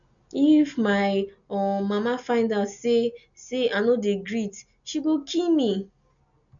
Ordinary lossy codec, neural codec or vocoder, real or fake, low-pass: none; none; real; 7.2 kHz